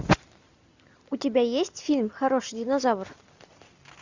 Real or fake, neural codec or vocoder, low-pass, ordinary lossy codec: real; none; 7.2 kHz; Opus, 64 kbps